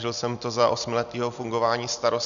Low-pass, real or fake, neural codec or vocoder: 7.2 kHz; real; none